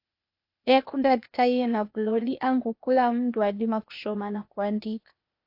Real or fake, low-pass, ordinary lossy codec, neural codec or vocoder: fake; 5.4 kHz; AAC, 32 kbps; codec, 16 kHz, 0.8 kbps, ZipCodec